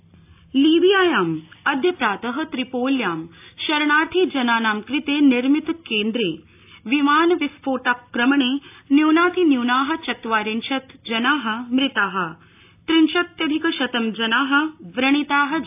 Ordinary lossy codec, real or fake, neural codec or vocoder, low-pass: AAC, 32 kbps; real; none; 3.6 kHz